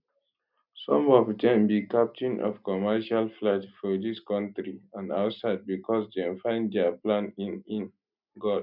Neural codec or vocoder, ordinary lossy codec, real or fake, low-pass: none; none; real; 5.4 kHz